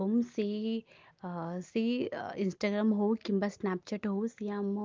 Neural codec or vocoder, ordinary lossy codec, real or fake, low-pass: none; Opus, 32 kbps; real; 7.2 kHz